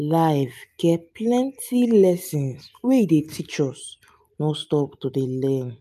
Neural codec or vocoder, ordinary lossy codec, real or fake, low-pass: none; MP3, 96 kbps; real; 14.4 kHz